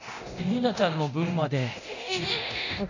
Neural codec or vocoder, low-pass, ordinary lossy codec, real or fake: codec, 24 kHz, 0.9 kbps, DualCodec; 7.2 kHz; none; fake